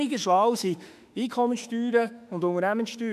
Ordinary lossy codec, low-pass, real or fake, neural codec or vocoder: none; 14.4 kHz; fake; autoencoder, 48 kHz, 32 numbers a frame, DAC-VAE, trained on Japanese speech